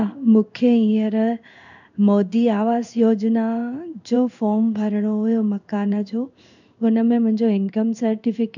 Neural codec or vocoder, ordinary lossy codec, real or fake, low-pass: codec, 16 kHz in and 24 kHz out, 1 kbps, XY-Tokenizer; none; fake; 7.2 kHz